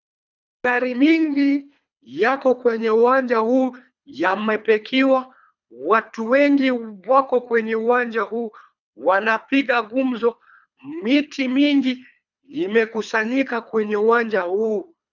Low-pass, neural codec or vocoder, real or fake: 7.2 kHz; codec, 24 kHz, 3 kbps, HILCodec; fake